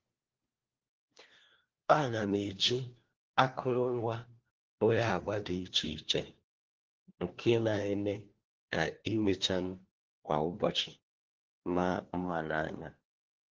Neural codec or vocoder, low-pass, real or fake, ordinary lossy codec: codec, 16 kHz, 1 kbps, FunCodec, trained on LibriTTS, 50 frames a second; 7.2 kHz; fake; Opus, 16 kbps